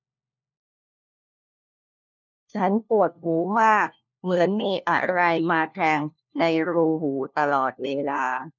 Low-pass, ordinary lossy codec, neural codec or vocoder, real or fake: 7.2 kHz; none; codec, 16 kHz, 1 kbps, FunCodec, trained on LibriTTS, 50 frames a second; fake